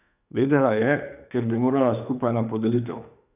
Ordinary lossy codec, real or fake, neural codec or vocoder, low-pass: none; fake; autoencoder, 48 kHz, 32 numbers a frame, DAC-VAE, trained on Japanese speech; 3.6 kHz